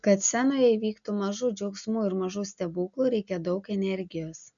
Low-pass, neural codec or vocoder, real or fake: 7.2 kHz; none; real